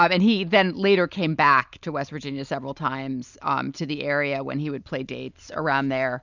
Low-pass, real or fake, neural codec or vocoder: 7.2 kHz; real; none